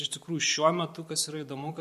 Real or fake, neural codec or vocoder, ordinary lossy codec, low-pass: fake; vocoder, 44.1 kHz, 128 mel bands every 512 samples, BigVGAN v2; MP3, 64 kbps; 14.4 kHz